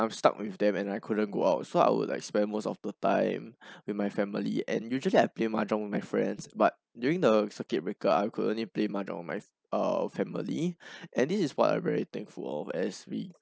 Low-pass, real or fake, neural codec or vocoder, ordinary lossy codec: none; real; none; none